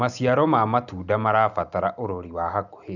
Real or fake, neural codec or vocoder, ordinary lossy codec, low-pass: real; none; none; 7.2 kHz